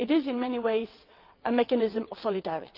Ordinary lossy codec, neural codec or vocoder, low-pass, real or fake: Opus, 32 kbps; vocoder, 22.05 kHz, 80 mel bands, WaveNeXt; 5.4 kHz; fake